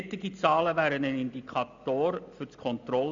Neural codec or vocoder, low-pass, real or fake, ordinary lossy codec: none; 7.2 kHz; real; AAC, 64 kbps